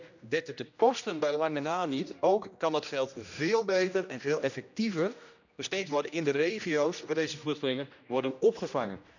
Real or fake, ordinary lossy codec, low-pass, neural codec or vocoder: fake; none; 7.2 kHz; codec, 16 kHz, 1 kbps, X-Codec, HuBERT features, trained on general audio